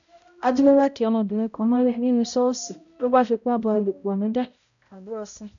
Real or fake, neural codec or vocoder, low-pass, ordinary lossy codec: fake; codec, 16 kHz, 0.5 kbps, X-Codec, HuBERT features, trained on balanced general audio; 7.2 kHz; none